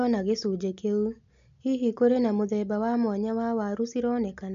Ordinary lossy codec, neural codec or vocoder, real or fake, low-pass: AAC, 96 kbps; none; real; 7.2 kHz